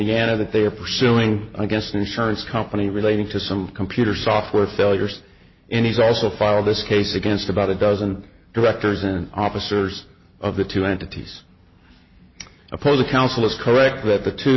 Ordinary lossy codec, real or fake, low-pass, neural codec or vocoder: MP3, 24 kbps; real; 7.2 kHz; none